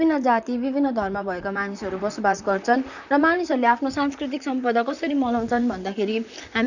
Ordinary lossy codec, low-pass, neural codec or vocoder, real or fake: none; 7.2 kHz; vocoder, 44.1 kHz, 128 mel bands, Pupu-Vocoder; fake